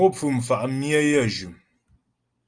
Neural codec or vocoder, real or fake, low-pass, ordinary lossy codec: none; real; 9.9 kHz; Opus, 24 kbps